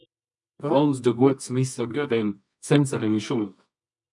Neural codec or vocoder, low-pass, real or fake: codec, 24 kHz, 0.9 kbps, WavTokenizer, medium music audio release; 10.8 kHz; fake